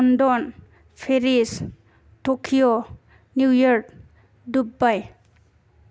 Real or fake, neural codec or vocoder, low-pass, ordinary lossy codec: real; none; none; none